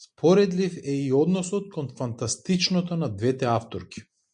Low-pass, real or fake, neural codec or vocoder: 9.9 kHz; real; none